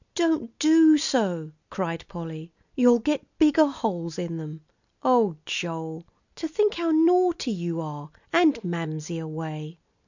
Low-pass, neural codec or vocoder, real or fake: 7.2 kHz; none; real